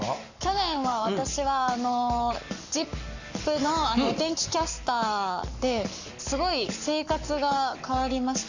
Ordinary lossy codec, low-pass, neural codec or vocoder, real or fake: none; 7.2 kHz; codec, 44.1 kHz, 7.8 kbps, Pupu-Codec; fake